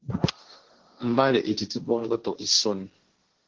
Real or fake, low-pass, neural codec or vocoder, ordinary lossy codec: fake; 7.2 kHz; codec, 16 kHz, 1.1 kbps, Voila-Tokenizer; Opus, 16 kbps